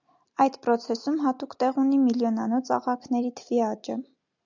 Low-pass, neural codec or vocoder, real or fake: 7.2 kHz; none; real